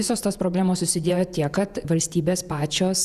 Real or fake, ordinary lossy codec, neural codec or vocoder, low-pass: fake; Opus, 64 kbps; vocoder, 48 kHz, 128 mel bands, Vocos; 14.4 kHz